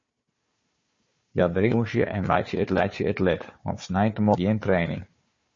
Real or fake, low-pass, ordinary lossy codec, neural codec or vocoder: fake; 7.2 kHz; MP3, 32 kbps; codec, 16 kHz, 4 kbps, FunCodec, trained on Chinese and English, 50 frames a second